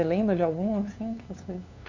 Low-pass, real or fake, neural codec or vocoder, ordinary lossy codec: 7.2 kHz; fake; codec, 16 kHz, 2 kbps, FunCodec, trained on LibriTTS, 25 frames a second; MP3, 64 kbps